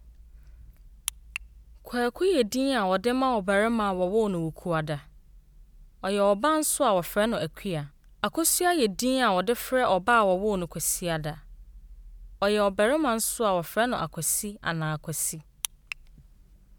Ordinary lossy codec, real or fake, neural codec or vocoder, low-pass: none; real; none; none